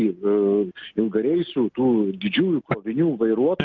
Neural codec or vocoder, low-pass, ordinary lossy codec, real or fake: none; 7.2 kHz; Opus, 32 kbps; real